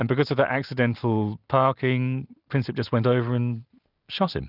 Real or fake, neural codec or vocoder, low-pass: real; none; 5.4 kHz